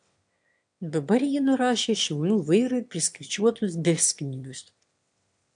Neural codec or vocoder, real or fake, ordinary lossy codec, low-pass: autoencoder, 22.05 kHz, a latent of 192 numbers a frame, VITS, trained on one speaker; fake; AAC, 64 kbps; 9.9 kHz